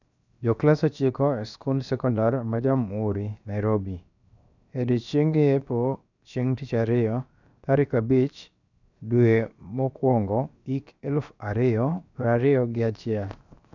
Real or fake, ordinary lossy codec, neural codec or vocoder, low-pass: fake; none; codec, 16 kHz, 0.7 kbps, FocalCodec; 7.2 kHz